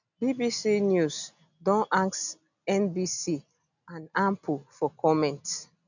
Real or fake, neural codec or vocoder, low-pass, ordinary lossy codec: real; none; 7.2 kHz; none